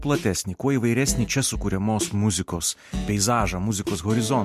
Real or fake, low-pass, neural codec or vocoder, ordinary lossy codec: real; 14.4 kHz; none; MP3, 64 kbps